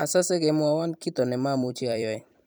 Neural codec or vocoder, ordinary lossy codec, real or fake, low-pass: vocoder, 44.1 kHz, 128 mel bands every 256 samples, BigVGAN v2; none; fake; none